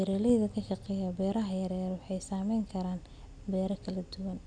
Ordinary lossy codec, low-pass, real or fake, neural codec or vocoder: none; 9.9 kHz; real; none